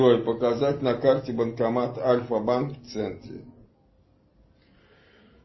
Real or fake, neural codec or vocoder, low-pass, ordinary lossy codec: real; none; 7.2 kHz; MP3, 24 kbps